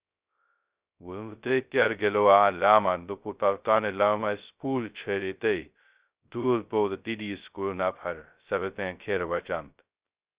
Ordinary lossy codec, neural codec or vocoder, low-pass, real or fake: Opus, 64 kbps; codec, 16 kHz, 0.2 kbps, FocalCodec; 3.6 kHz; fake